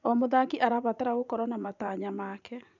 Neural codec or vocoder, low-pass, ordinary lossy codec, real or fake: vocoder, 44.1 kHz, 80 mel bands, Vocos; 7.2 kHz; none; fake